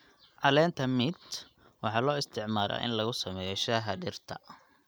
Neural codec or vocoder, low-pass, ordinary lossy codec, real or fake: none; none; none; real